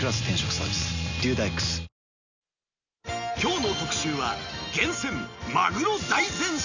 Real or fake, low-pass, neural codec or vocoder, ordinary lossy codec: real; 7.2 kHz; none; none